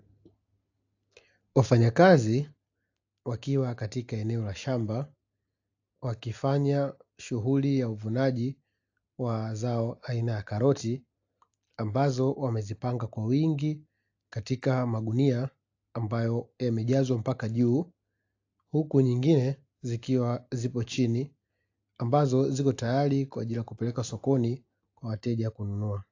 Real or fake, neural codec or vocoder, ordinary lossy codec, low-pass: real; none; AAC, 48 kbps; 7.2 kHz